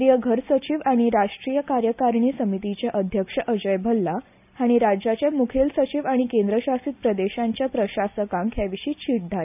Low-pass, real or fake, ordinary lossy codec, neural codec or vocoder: 3.6 kHz; real; none; none